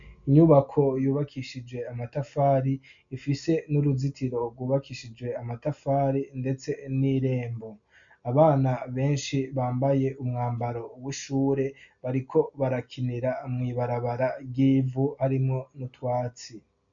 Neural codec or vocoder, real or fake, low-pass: none; real; 7.2 kHz